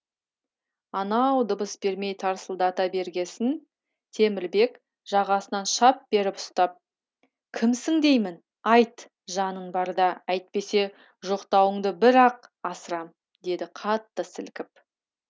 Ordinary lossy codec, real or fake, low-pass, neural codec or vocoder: none; real; none; none